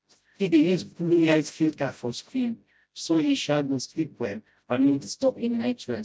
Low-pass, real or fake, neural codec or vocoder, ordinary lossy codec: none; fake; codec, 16 kHz, 0.5 kbps, FreqCodec, smaller model; none